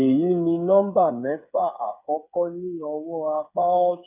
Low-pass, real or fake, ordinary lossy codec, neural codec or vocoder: 3.6 kHz; fake; none; codec, 16 kHz, 8 kbps, FreqCodec, smaller model